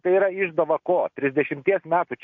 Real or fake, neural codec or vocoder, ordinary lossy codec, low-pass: real; none; MP3, 48 kbps; 7.2 kHz